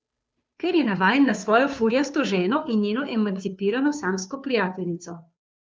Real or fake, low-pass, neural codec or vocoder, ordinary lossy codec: fake; none; codec, 16 kHz, 2 kbps, FunCodec, trained on Chinese and English, 25 frames a second; none